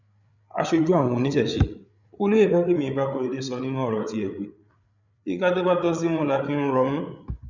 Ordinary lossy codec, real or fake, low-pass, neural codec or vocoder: none; fake; 7.2 kHz; codec, 16 kHz, 8 kbps, FreqCodec, larger model